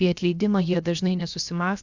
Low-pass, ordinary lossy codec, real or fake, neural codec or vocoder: 7.2 kHz; Opus, 64 kbps; fake; codec, 16 kHz, about 1 kbps, DyCAST, with the encoder's durations